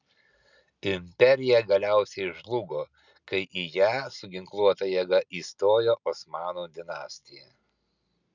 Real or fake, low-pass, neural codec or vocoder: real; 7.2 kHz; none